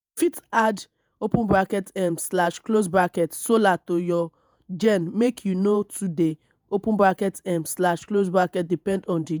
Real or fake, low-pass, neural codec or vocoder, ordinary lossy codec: fake; none; vocoder, 48 kHz, 128 mel bands, Vocos; none